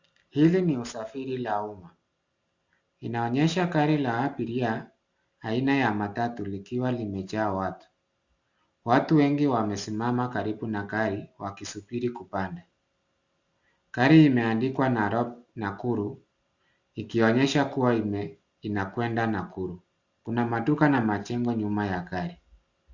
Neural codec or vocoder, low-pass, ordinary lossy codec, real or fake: none; 7.2 kHz; Opus, 64 kbps; real